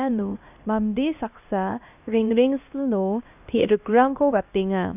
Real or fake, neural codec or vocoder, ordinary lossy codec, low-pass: fake; codec, 16 kHz, 1 kbps, X-Codec, HuBERT features, trained on LibriSpeech; none; 3.6 kHz